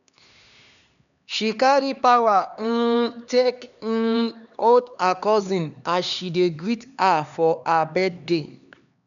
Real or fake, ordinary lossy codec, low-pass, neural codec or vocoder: fake; none; 7.2 kHz; codec, 16 kHz, 2 kbps, X-Codec, HuBERT features, trained on LibriSpeech